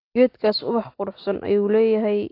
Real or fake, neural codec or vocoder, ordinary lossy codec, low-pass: real; none; AAC, 32 kbps; 5.4 kHz